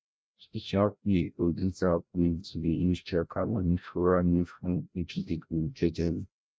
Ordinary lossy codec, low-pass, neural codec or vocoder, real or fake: none; none; codec, 16 kHz, 0.5 kbps, FreqCodec, larger model; fake